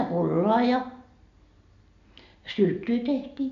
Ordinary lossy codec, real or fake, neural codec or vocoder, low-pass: AAC, 64 kbps; real; none; 7.2 kHz